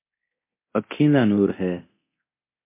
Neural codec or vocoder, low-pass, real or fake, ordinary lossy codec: codec, 24 kHz, 0.9 kbps, DualCodec; 3.6 kHz; fake; MP3, 24 kbps